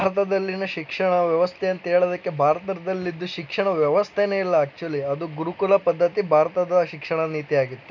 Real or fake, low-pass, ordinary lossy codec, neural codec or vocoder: real; 7.2 kHz; none; none